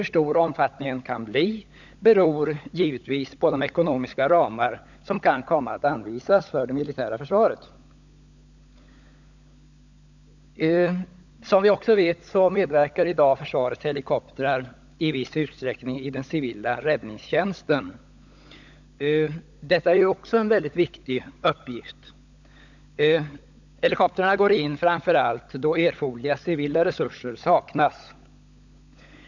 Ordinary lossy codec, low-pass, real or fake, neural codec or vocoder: none; 7.2 kHz; fake; codec, 16 kHz, 16 kbps, FunCodec, trained on LibriTTS, 50 frames a second